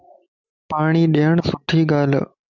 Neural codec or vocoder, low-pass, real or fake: none; 7.2 kHz; real